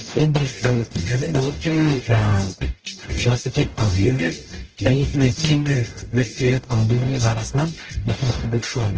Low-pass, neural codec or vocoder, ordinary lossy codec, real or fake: 7.2 kHz; codec, 44.1 kHz, 0.9 kbps, DAC; Opus, 16 kbps; fake